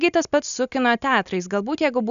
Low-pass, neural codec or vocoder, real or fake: 7.2 kHz; none; real